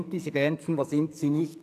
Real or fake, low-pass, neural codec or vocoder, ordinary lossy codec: fake; 14.4 kHz; codec, 44.1 kHz, 2.6 kbps, SNAC; MP3, 96 kbps